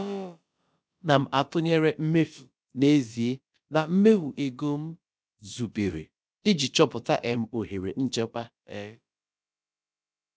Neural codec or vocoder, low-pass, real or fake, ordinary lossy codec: codec, 16 kHz, about 1 kbps, DyCAST, with the encoder's durations; none; fake; none